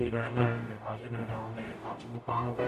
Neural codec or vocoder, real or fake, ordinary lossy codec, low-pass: codec, 44.1 kHz, 0.9 kbps, DAC; fake; MP3, 64 kbps; 14.4 kHz